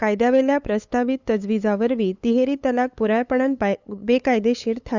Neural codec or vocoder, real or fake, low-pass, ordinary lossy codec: codec, 16 kHz, 8 kbps, FunCodec, trained on LibriTTS, 25 frames a second; fake; 7.2 kHz; Opus, 64 kbps